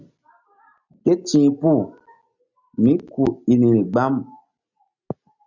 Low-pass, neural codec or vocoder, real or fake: 7.2 kHz; none; real